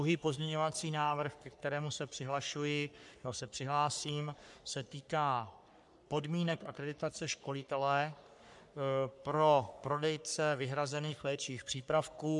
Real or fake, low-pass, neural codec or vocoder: fake; 10.8 kHz; codec, 44.1 kHz, 3.4 kbps, Pupu-Codec